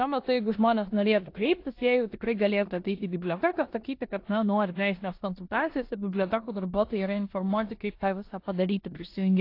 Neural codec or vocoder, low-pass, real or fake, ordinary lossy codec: codec, 16 kHz in and 24 kHz out, 0.9 kbps, LongCat-Audio-Codec, four codebook decoder; 5.4 kHz; fake; AAC, 32 kbps